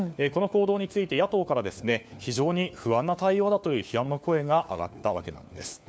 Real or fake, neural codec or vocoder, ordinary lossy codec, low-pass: fake; codec, 16 kHz, 4 kbps, FunCodec, trained on LibriTTS, 50 frames a second; none; none